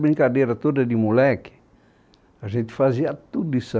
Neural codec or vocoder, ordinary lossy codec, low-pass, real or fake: none; none; none; real